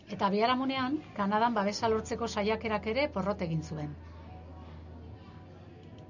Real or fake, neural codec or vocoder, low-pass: real; none; 7.2 kHz